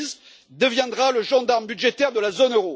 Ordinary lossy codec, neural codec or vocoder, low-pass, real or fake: none; none; none; real